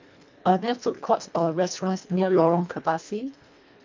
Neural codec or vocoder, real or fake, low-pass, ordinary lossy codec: codec, 24 kHz, 1.5 kbps, HILCodec; fake; 7.2 kHz; MP3, 64 kbps